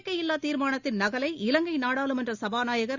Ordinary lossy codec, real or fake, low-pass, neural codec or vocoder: Opus, 64 kbps; real; 7.2 kHz; none